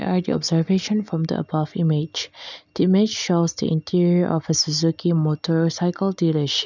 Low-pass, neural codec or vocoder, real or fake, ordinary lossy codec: 7.2 kHz; none; real; none